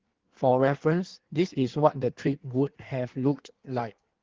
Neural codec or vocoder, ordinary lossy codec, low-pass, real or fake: codec, 16 kHz in and 24 kHz out, 1.1 kbps, FireRedTTS-2 codec; Opus, 16 kbps; 7.2 kHz; fake